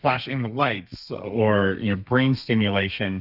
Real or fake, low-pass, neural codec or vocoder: fake; 5.4 kHz; codec, 32 kHz, 1.9 kbps, SNAC